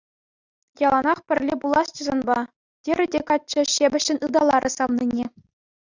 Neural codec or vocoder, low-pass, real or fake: none; 7.2 kHz; real